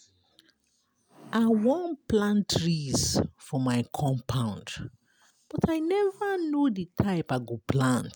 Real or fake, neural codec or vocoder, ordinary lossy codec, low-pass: real; none; none; none